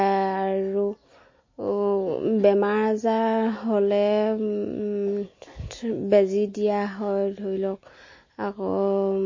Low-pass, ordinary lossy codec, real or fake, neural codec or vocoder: 7.2 kHz; MP3, 32 kbps; real; none